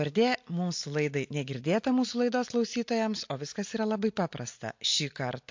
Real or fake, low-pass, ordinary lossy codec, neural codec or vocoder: real; 7.2 kHz; MP3, 48 kbps; none